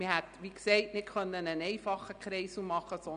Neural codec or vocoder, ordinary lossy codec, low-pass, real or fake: none; none; 9.9 kHz; real